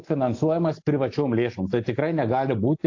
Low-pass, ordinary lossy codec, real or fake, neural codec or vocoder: 7.2 kHz; AAC, 48 kbps; real; none